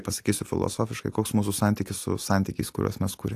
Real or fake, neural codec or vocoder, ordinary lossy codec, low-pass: real; none; AAC, 64 kbps; 14.4 kHz